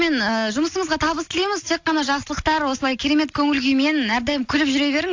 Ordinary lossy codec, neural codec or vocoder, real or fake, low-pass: AAC, 48 kbps; none; real; 7.2 kHz